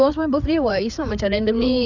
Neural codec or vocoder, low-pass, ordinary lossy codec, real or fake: codec, 16 kHz, 4 kbps, FreqCodec, larger model; 7.2 kHz; none; fake